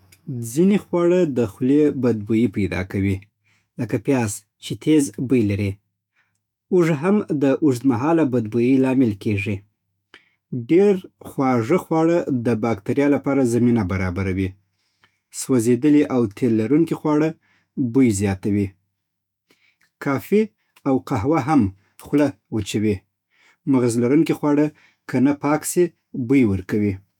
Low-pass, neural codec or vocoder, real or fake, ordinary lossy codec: 19.8 kHz; autoencoder, 48 kHz, 128 numbers a frame, DAC-VAE, trained on Japanese speech; fake; none